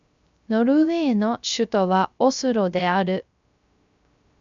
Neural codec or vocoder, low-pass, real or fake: codec, 16 kHz, 0.3 kbps, FocalCodec; 7.2 kHz; fake